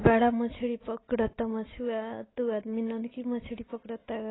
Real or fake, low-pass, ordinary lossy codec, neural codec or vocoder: real; 7.2 kHz; AAC, 16 kbps; none